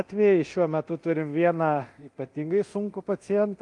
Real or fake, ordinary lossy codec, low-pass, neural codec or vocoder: fake; Opus, 32 kbps; 10.8 kHz; codec, 24 kHz, 0.9 kbps, DualCodec